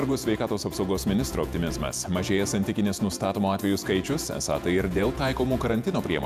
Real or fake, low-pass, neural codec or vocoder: fake; 14.4 kHz; vocoder, 48 kHz, 128 mel bands, Vocos